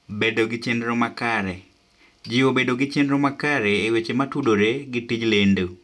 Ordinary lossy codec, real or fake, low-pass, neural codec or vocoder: none; real; none; none